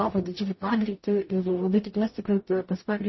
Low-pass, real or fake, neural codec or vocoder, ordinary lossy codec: 7.2 kHz; fake; codec, 44.1 kHz, 0.9 kbps, DAC; MP3, 24 kbps